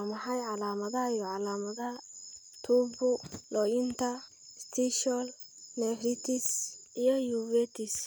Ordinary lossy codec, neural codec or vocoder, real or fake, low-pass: none; none; real; none